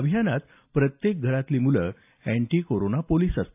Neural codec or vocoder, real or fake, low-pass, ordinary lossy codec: none; real; 3.6 kHz; AAC, 32 kbps